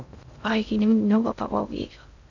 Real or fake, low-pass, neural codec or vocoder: fake; 7.2 kHz; codec, 16 kHz in and 24 kHz out, 0.6 kbps, FocalCodec, streaming, 2048 codes